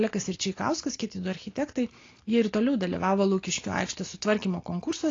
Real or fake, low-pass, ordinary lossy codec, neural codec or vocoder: real; 7.2 kHz; AAC, 32 kbps; none